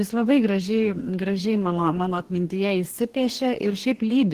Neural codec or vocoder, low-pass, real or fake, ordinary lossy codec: codec, 44.1 kHz, 2.6 kbps, DAC; 14.4 kHz; fake; Opus, 16 kbps